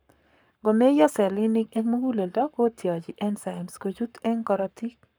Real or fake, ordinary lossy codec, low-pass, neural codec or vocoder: fake; none; none; codec, 44.1 kHz, 7.8 kbps, Pupu-Codec